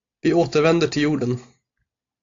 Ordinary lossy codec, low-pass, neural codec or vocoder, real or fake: AAC, 48 kbps; 7.2 kHz; none; real